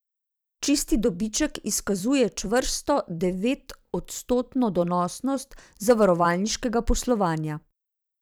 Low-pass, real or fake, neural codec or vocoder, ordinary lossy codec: none; fake; vocoder, 44.1 kHz, 128 mel bands every 256 samples, BigVGAN v2; none